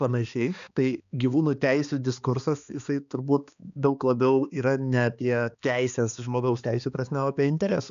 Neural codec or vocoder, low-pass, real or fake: codec, 16 kHz, 2 kbps, X-Codec, HuBERT features, trained on balanced general audio; 7.2 kHz; fake